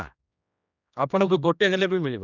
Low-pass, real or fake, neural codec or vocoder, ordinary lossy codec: 7.2 kHz; fake; codec, 16 kHz, 1 kbps, X-Codec, HuBERT features, trained on general audio; none